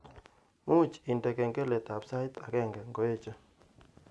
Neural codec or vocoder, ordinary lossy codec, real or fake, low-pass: none; none; real; none